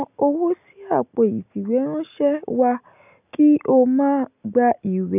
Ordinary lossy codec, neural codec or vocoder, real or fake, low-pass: none; none; real; 3.6 kHz